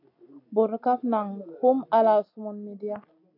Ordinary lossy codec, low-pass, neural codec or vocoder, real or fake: AAC, 32 kbps; 5.4 kHz; none; real